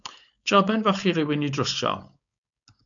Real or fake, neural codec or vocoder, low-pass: fake; codec, 16 kHz, 4.8 kbps, FACodec; 7.2 kHz